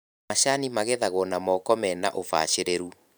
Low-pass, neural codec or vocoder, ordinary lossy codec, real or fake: none; vocoder, 44.1 kHz, 128 mel bands every 512 samples, BigVGAN v2; none; fake